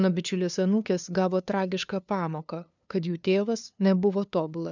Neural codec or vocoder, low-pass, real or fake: codec, 16 kHz, 2 kbps, FunCodec, trained on LibriTTS, 25 frames a second; 7.2 kHz; fake